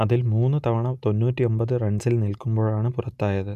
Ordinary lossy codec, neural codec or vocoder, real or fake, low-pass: none; none; real; 14.4 kHz